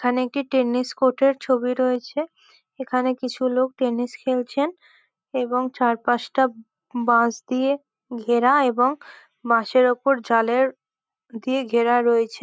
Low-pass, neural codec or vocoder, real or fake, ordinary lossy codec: none; none; real; none